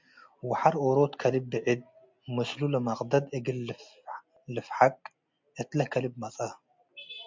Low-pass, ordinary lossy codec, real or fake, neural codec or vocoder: 7.2 kHz; MP3, 64 kbps; real; none